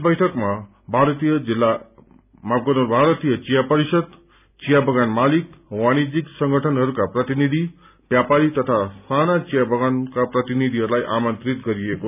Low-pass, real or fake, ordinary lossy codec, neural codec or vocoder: 3.6 kHz; real; none; none